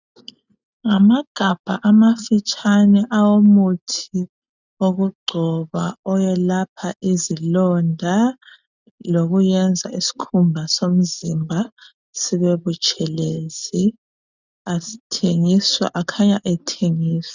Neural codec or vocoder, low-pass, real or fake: none; 7.2 kHz; real